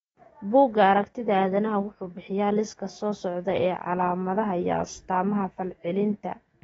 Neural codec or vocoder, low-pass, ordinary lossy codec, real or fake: autoencoder, 48 kHz, 128 numbers a frame, DAC-VAE, trained on Japanese speech; 19.8 kHz; AAC, 24 kbps; fake